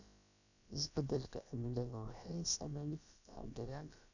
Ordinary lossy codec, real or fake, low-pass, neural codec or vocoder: none; fake; 7.2 kHz; codec, 16 kHz, about 1 kbps, DyCAST, with the encoder's durations